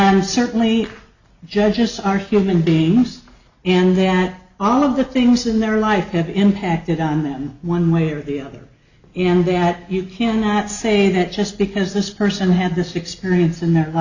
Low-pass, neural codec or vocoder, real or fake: 7.2 kHz; none; real